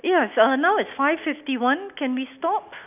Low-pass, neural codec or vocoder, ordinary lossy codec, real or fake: 3.6 kHz; none; none; real